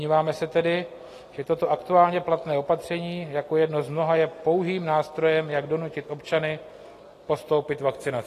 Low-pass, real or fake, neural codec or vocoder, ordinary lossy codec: 14.4 kHz; real; none; AAC, 48 kbps